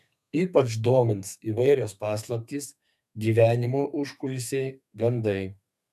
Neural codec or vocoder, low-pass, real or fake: codec, 32 kHz, 1.9 kbps, SNAC; 14.4 kHz; fake